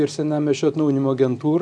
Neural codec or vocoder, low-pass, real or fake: none; 9.9 kHz; real